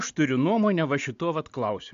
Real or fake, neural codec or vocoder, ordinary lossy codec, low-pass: real; none; AAC, 64 kbps; 7.2 kHz